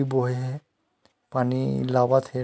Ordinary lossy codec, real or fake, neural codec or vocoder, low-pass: none; real; none; none